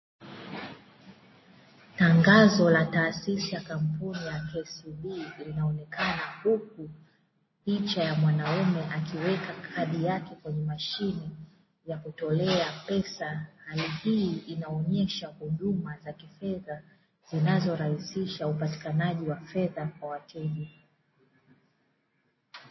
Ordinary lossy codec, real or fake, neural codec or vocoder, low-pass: MP3, 24 kbps; real; none; 7.2 kHz